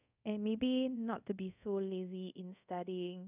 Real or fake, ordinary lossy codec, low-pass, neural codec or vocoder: fake; none; 3.6 kHz; codec, 24 kHz, 0.5 kbps, DualCodec